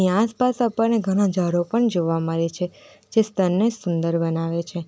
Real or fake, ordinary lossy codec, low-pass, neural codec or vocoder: real; none; none; none